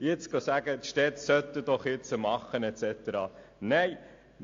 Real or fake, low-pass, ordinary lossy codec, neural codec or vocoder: real; 7.2 kHz; AAC, 48 kbps; none